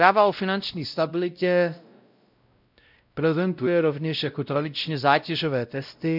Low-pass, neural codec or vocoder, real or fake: 5.4 kHz; codec, 16 kHz, 0.5 kbps, X-Codec, WavLM features, trained on Multilingual LibriSpeech; fake